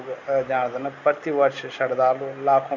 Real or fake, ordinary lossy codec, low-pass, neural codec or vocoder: real; none; 7.2 kHz; none